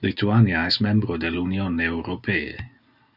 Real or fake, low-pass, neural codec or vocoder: real; 5.4 kHz; none